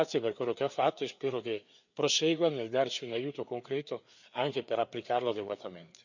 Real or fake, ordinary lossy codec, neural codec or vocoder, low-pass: fake; none; codec, 16 kHz, 4 kbps, FreqCodec, larger model; 7.2 kHz